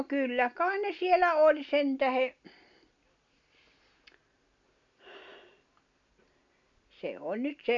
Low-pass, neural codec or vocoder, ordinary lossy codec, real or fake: 7.2 kHz; none; MP3, 64 kbps; real